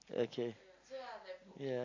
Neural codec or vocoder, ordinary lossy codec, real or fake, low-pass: none; AAC, 32 kbps; real; 7.2 kHz